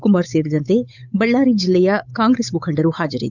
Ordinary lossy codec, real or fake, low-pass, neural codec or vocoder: none; fake; 7.2 kHz; codec, 16 kHz, 8 kbps, FunCodec, trained on Chinese and English, 25 frames a second